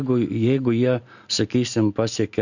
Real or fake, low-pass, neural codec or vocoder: real; 7.2 kHz; none